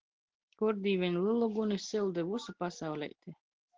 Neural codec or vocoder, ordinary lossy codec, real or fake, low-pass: none; Opus, 16 kbps; real; 7.2 kHz